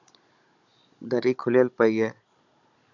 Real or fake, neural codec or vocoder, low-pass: fake; codec, 16 kHz, 16 kbps, FunCodec, trained on Chinese and English, 50 frames a second; 7.2 kHz